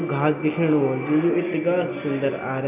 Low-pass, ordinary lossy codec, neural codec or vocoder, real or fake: 3.6 kHz; none; none; real